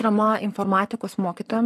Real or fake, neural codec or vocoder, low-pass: fake; codec, 44.1 kHz, 7.8 kbps, Pupu-Codec; 14.4 kHz